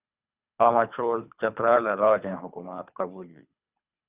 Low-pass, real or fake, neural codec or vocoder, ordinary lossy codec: 3.6 kHz; fake; codec, 24 kHz, 3 kbps, HILCodec; Opus, 64 kbps